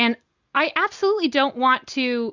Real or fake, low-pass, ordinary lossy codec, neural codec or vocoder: real; 7.2 kHz; Opus, 64 kbps; none